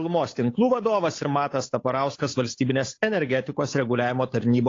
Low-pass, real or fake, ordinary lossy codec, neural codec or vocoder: 7.2 kHz; fake; AAC, 32 kbps; codec, 16 kHz, 8 kbps, FunCodec, trained on Chinese and English, 25 frames a second